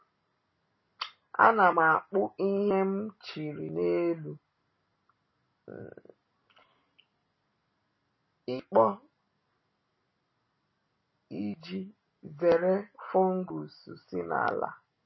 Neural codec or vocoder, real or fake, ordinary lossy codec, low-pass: none; real; MP3, 24 kbps; 7.2 kHz